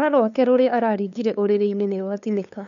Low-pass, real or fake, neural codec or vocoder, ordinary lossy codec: 7.2 kHz; fake; codec, 16 kHz, 2 kbps, FunCodec, trained on LibriTTS, 25 frames a second; none